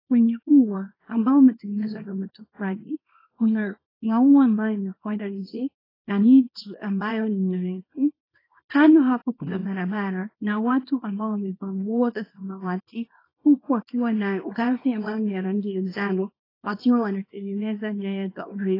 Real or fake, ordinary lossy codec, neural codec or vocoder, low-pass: fake; AAC, 24 kbps; codec, 24 kHz, 0.9 kbps, WavTokenizer, small release; 5.4 kHz